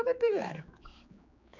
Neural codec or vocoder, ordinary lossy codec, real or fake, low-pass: codec, 16 kHz, 4 kbps, X-Codec, HuBERT features, trained on general audio; none; fake; 7.2 kHz